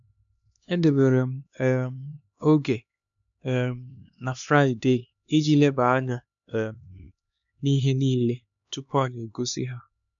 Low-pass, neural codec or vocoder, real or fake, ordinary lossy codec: 7.2 kHz; codec, 16 kHz, 2 kbps, X-Codec, HuBERT features, trained on LibriSpeech; fake; none